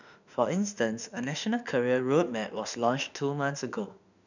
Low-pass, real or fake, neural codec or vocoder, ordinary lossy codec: 7.2 kHz; fake; autoencoder, 48 kHz, 32 numbers a frame, DAC-VAE, trained on Japanese speech; none